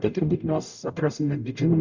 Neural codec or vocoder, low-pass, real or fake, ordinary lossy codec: codec, 44.1 kHz, 0.9 kbps, DAC; 7.2 kHz; fake; Opus, 64 kbps